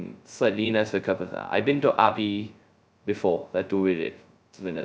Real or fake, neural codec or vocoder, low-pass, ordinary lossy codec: fake; codec, 16 kHz, 0.2 kbps, FocalCodec; none; none